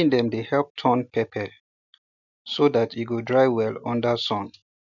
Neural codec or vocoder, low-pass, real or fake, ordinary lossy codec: none; 7.2 kHz; real; none